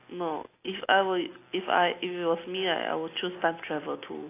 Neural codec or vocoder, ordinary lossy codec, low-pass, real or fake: none; AAC, 24 kbps; 3.6 kHz; real